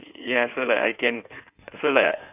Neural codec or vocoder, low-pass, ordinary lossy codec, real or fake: codec, 16 kHz in and 24 kHz out, 1.1 kbps, FireRedTTS-2 codec; 3.6 kHz; none; fake